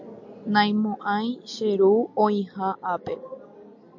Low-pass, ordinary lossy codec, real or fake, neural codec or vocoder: 7.2 kHz; MP3, 48 kbps; real; none